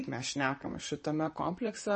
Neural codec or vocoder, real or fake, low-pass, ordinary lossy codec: vocoder, 44.1 kHz, 128 mel bands, Pupu-Vocoder; fake; 10.8 kHz; MP3, 32 kbps